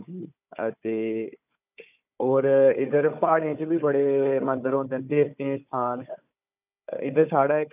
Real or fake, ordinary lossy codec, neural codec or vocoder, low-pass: fake; none; codec, 16 kHz, 4 kbps, FunCodec, trained on Chinese and English, 50 frames a second; 3.6 kHz